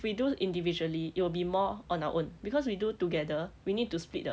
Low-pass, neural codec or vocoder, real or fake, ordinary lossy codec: none; none; real; none